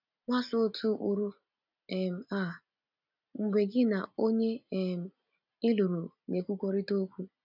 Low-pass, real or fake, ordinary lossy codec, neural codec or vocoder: 5.4 kHz; real; none; none